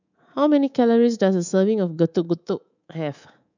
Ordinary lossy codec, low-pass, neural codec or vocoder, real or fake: none; 7.2 kHz; codec, 24 kHz, 3.1 kbps, DualCodec; fake